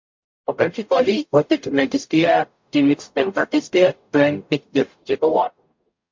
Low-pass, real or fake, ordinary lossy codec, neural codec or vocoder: 7.2 kHz; fake; MP3, 48 kbps; codec, 44.1 kHz, 0.9 kbps, DAC